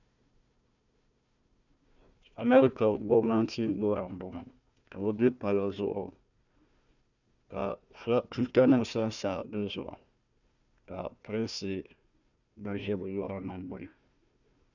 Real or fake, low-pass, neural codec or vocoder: fake; 7.2 kHz; codec, 16 kHz, 1 kbps, FunCodec, trained on Chinese and English, 50 frames a second